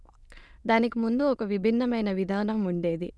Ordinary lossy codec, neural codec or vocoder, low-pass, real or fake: none; autoencoder, 22.05 kHz, a latent of 192 numbers a frame, VITS, trained on many speakers; none; fake